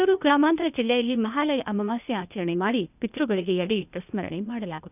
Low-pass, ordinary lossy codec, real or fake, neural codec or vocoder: 3.6 kHz; none; fake; codec, 16 kHz, 0.8 kbps, ZipCodec